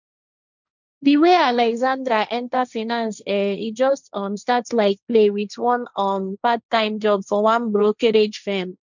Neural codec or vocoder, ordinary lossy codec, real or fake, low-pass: codec, 16 kHz, 1.1 kbps, Voila-Tokenizer; none; fake; 7.2 kHz